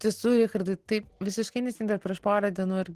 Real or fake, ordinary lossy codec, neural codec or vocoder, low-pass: real; Opus, 16 kbps; none; 14.4 kHz